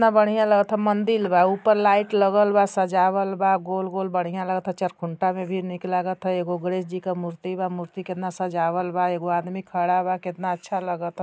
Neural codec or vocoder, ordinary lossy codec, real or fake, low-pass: none; none; real; none